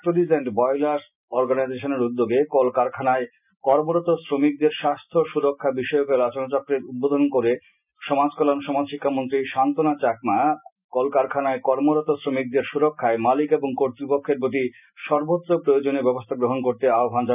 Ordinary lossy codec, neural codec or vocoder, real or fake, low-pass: none; none; real; 3.6 kHz